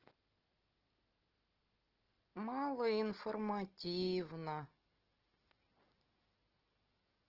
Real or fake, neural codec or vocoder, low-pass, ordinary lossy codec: real; none; 5.4 kHz; Opus, 16 kbps